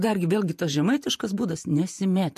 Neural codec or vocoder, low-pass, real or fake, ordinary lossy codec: vocoder, 48 kHz, 128 mel bands, Vocos; 14.4 kHz; fake; MP3, 64 kbps